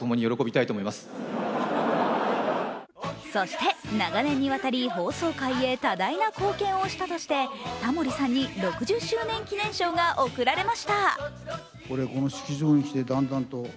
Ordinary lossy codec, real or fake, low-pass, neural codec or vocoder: none; real; none; none